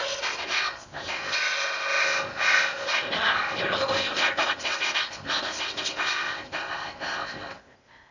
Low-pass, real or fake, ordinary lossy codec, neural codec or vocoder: 7.2 kHz; fake; none; codec, 16 kHz in and 24 kHz out, 0.6 kbps, FocalCodec, streaming, 2048 codes